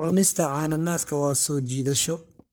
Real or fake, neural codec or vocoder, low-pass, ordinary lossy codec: fake; codec, 44.1 kHz, 1.7 kbps, Pupu-Codec; none; none